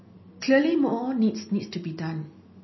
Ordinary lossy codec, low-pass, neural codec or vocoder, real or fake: MP3, 24 kbps; 7.2 kHz; none; real